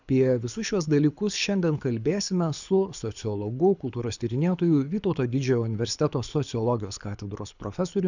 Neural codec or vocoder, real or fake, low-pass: codec, 24 kHz, 6 kbps, HILCodec; fake; 7.2 kHz